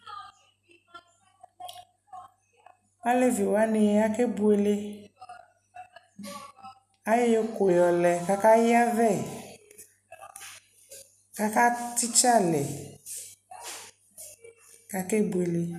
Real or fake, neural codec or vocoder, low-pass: real; none; 14.4 kHz